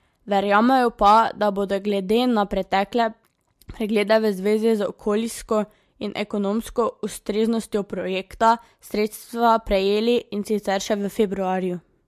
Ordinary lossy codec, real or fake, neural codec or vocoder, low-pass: MP3, 64 kbps; real; none; 14.4 kHz